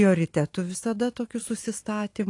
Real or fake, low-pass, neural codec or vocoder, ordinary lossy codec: fake; 10.8 kHz; vocoder, 44.1 kHz, 128 mel bands every 512 samples, BigVGAN v2; AAC, 48 kbps